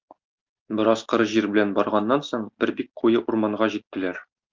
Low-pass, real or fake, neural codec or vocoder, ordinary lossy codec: 7.2 kHz; real; none; Opus, 24 kbps